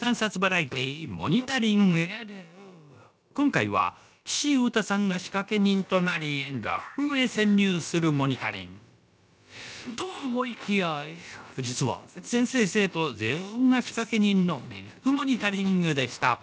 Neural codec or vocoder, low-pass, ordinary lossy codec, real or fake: codec, 16 kHz, about 1 kbps, DyCAST, with the encoder's durations; none; none; fake